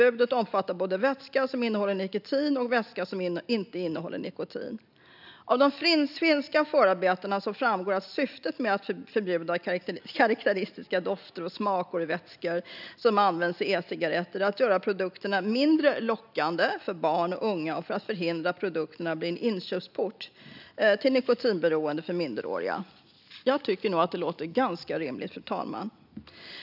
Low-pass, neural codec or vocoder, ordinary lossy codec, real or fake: 5.4 kHz; none; none; real